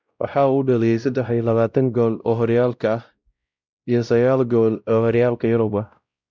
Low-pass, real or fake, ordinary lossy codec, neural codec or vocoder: none; fake; none; codec, 16 kHz, 0.5 kbps, X-Codec, WavLM features, trained on Multilingual LibriSpeech